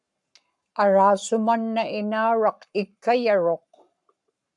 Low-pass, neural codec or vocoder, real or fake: 10.8 kHz; codec, 44.1 kHz, 7.8 kbps, Pupu-Codec; fake